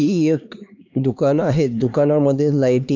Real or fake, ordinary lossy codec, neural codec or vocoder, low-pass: fake; none; codec, 16 kHz, 2 kbps, X-Codec, HuBERT features, trained on LibriSpeech; 7.2 kHz